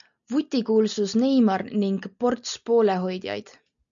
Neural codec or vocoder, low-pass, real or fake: none; 7.2 kHz; real